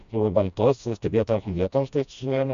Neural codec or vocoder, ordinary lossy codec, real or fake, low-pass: codec, 16 kHz, 1 kbps, FreqCodec, smaller model; AAC, 96 kbps; fake; 7.2 kHz